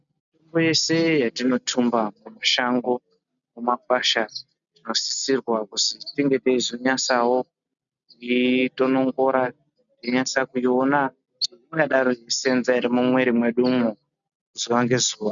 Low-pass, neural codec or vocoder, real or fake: 7.2 kHz; none; real